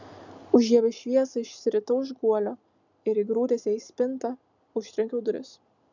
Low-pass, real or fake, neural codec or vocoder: 7.2 kHz; real; none